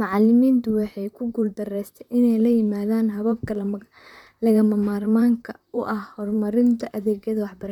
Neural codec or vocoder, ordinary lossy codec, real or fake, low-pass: vocoder, 44.1 kHz, 128 mel bands, Pupu-Vocoder; none; fake; 19.8 kHz